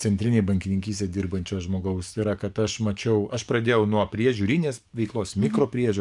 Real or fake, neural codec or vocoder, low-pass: fake; codec, 44.1 kHz, 7.8 kbps, DAC; 10.8 kHz